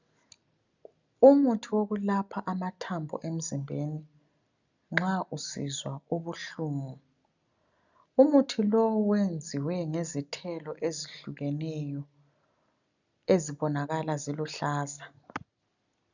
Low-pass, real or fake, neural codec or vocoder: 7.2 kHz; real; none